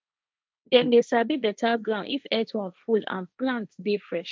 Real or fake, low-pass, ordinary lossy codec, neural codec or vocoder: fake; none; none; codec, 16 kHz, 1.1 kbps, Voila-Tokenizer